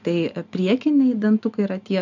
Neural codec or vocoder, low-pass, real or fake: none; 7.2 kHz; real